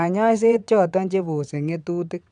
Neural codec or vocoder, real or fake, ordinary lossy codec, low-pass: vocoder, 22.05 kHz, 80 mel bands, WaveNeXt; fake; none; 9.9 kHz